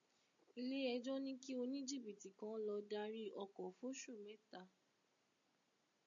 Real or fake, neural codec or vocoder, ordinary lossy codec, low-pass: real; none; MP3, 48 kbps; 7.2 kHz